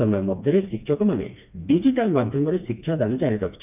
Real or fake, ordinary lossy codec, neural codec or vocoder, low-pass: fake; none; codec, 16 kHz, 2 kbps, FreqCodec, smaller model; 3.6 kHz